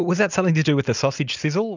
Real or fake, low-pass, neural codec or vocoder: real; 7.2 kHz; none